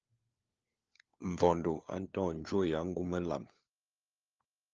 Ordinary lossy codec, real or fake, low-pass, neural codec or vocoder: Opus, 24 kbps; fake; 7.2 kHz; codec, 16 kHz, 4 kbps, FunCodec, trained on LibriTTS, 50 frames a second